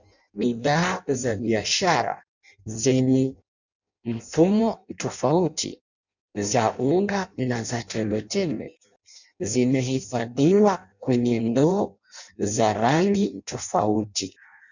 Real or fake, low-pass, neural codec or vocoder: fake; 7.2 kHz; codec, 16 kHz in and 24 kHz out, 0.6 kbps, FireRedTTS-2 codec